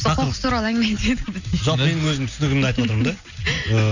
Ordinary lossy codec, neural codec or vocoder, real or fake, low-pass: none; none; real; 7.2 kHz